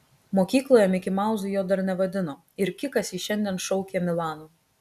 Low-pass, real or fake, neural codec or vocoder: 14.4 kHz; real; none